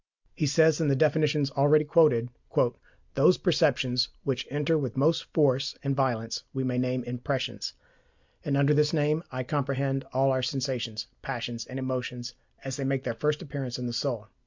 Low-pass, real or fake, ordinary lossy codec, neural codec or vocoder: 7.2 kHz; real; MP3, 64 kbps; none